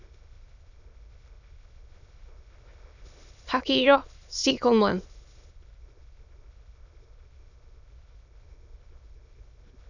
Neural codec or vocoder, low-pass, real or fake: autoencoder, 22.05 kHz, a latent of 192 numbers a frame, VITS, trained on many speakers; 7.2 kHz; fake